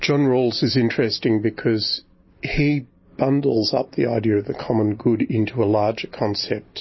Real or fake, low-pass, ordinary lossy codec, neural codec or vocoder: real; 7.2 kHz; MP3, 24 kbps; none